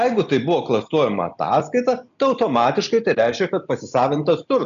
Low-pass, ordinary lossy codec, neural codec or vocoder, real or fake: 7.2 kHz; AAC, 96 kbps; none; real